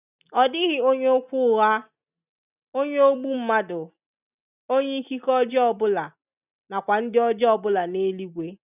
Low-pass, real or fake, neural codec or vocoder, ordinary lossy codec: 3.6 kHz; real; none; none